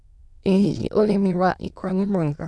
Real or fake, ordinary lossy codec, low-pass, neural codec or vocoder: fake; none; none; autoencoder, 22.05 kHz, a latent of 192 numbers a frame, VITS, trained on many speakers